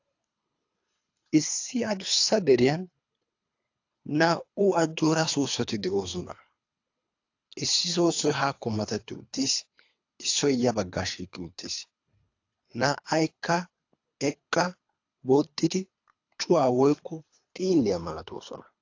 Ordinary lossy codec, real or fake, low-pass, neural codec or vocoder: AAC, 48 kbps; fake; 7.2 kHz; codec, 24 kHz, 3 kbps, HILCodec